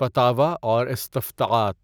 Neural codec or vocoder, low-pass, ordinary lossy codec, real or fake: none; none; none; real